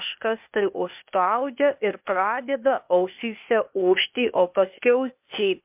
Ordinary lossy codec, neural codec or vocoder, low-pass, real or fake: MP3, 32 kbps; codec, 16 kHz, 0.8 kbps, ZipCodec; 3.6 kHz; fake